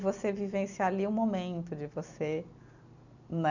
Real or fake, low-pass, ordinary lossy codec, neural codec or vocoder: fake; 7.2 kHz; none; vocoder, 44.1 kHz, 128 mel bands every 256 samples, BigVGAN v2